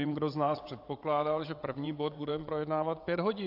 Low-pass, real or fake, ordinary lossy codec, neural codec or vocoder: 5.4 kHz; fake; AAC, 48 kbps; vocoder, 22.05 kHz, 80 mel bands, WaveNeXt